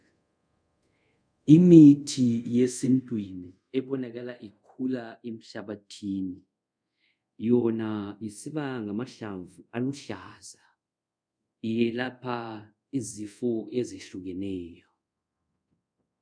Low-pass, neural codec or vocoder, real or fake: 9.9 kHz; codec, 24 kHz, 0.5 kbps, DualCodec; fake